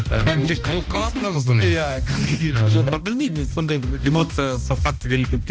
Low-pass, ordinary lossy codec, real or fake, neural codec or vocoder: none; none; fake; codec, 16 kHz, 1 kbps, X-Codec, HuBERT features, trained on balanced general audio